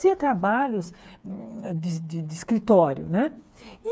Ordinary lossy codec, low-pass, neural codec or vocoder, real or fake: none; none; codec, 16 kHz, 4 kbps, FreqCodec, smaller model; fake